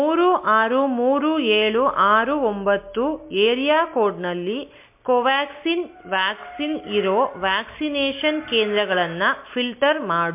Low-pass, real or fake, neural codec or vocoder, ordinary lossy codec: 3.6 kHz; real; none; MP3, 32 kbps